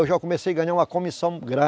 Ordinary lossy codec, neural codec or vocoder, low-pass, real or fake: none; none; none; real